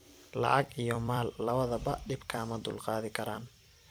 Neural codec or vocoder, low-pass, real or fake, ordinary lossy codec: vocoder, 44.1 kHz, 128 mel bands every 256 samples, BigVGAN v2; none; fake; none